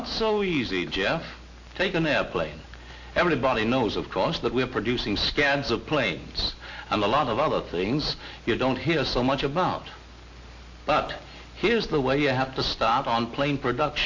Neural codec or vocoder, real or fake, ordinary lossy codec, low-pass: none; real; AAC, 48 kbps; 7.2 kHz